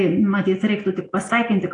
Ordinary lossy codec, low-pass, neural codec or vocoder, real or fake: AAC, 48 kbps; 9.9 kHz; none; real